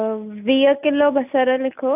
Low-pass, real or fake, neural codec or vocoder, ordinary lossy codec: 3.6 kHz; real; none; none